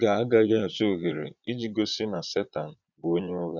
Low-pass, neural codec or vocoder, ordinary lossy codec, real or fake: 7.2 kHz; vocoder, 22.05 kHz, 80 mel bands, Vocos; none; fake